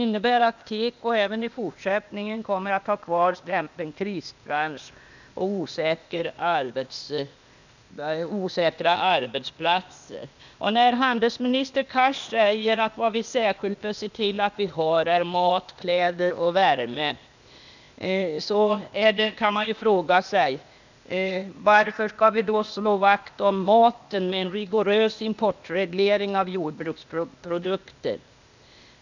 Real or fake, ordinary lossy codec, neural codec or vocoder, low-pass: fake; none; codec, 16 kHz, 0.8 kbps, ZipCodec; 7.2 kHz